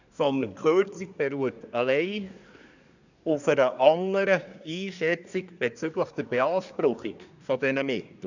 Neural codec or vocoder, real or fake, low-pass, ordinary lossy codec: codec, 24 kHz, 1 kbps, SNAC; fake; 7.2 kHz; none